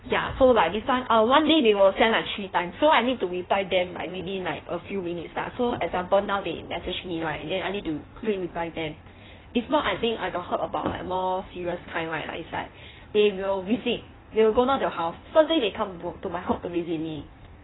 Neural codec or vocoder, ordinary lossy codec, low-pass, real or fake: codec, 16 kHz in and 24 kHz out, 1.1 kbps, FireRedTTS-2 codec; AAC, 16 kbps; 7.2 kHz; fake